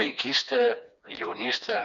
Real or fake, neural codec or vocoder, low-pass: fake; codec, 16 kHz, 2 kbps, FreqCodec, smaller model; 7.2 kHz